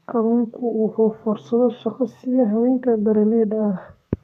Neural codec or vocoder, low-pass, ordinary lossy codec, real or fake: codec, 32 kHz, 1.9 kbps, SNAC; 14.4 kHz; none; fake